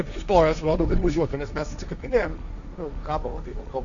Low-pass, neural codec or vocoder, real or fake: 7.2 kHz; codec, 16 kHz, 1.1 kbps, Voila-Tokenizer; fake